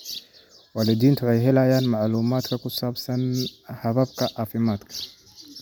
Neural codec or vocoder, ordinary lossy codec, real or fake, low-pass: none; none; real; none